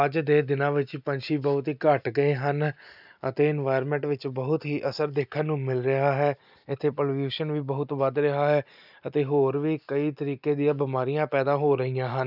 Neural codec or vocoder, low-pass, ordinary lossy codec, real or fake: none; 5.4 kHz; none; real